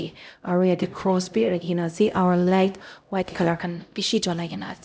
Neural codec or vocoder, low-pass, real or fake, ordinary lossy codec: codec, 16 kHz, 0.5 kbps, X-Codec, HuBERT features, trained on LibriSpeech; none; fake; none